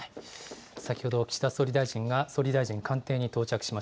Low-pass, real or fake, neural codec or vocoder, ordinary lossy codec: none; real; none; none